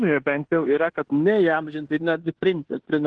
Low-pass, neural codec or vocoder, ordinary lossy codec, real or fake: 9.9 kHz; codec, 16 kHz in and 24 kHz out, 0.9 kbps, LongCat-Audio-Codec, fine tuned four codebook decoder; Opus, 16 kbps; fake